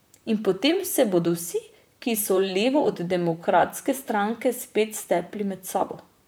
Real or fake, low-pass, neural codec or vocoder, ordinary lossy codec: fake; none; vocoder, 44.1 kHz, 128 mel bands, Pupu-Vocoder; none